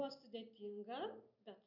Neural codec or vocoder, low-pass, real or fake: none; 5.4 kHz; real